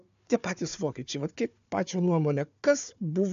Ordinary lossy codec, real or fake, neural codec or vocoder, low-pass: AAC, 96 kbps; fake; codec, 16 kHz, 4 kbps, FunCodec, trained on Chinese and English, 50 frames a second; 7.2 kHz